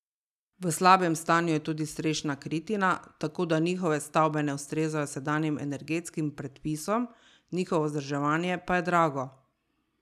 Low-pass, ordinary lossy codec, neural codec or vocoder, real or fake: 14.4 kHz; none; none; real